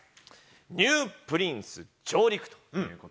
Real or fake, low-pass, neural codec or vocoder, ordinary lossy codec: real; none; none; none